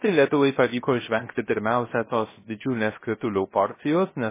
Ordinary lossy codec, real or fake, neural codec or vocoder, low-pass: MP3, 16 kbps; fake; codec, 16 kHz, 0.3 kbps, FocalCodec; 3.6 kHz